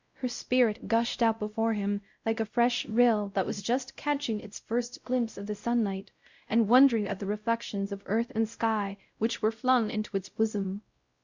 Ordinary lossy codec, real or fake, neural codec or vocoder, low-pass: Opus, 64 kbps; fake; codec, 16 kHz, 0.5 kbps, X-Codec, WavLM features, trained on Multilingual LibriSpeech; 7.2 kHz